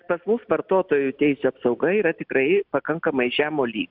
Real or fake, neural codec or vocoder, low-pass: real; none; 5.4 kHz